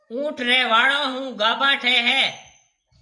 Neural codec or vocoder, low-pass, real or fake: vocoder, 24 kHz, 100 mel bands, Vocos; 10.8 kHz; fake